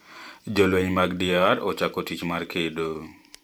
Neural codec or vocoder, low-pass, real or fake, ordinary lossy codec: vocoder, 44.1 kHz, 128 mel bands every 512 samples, BigVGAN v2; none; fake; none